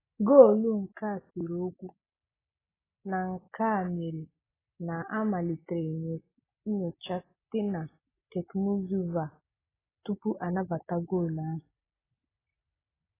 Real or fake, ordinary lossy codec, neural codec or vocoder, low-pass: real; AAC, 16 kbps; none; 3.6 kHz